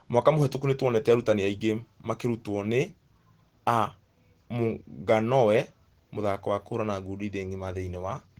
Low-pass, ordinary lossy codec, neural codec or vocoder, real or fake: 19.8 kHz; Opus, 16 kbps; none; real